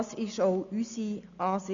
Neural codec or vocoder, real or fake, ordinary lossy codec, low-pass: none; real; none; 7.2 kHz